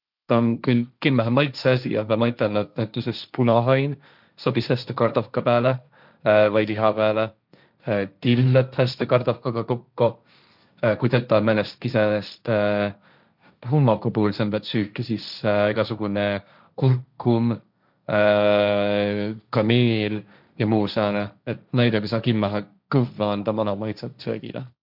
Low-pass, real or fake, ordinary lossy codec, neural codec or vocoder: 5.4 kHz; fake; none; codec, 16 kHz, 1.1 kbps, Voila-Tokenizer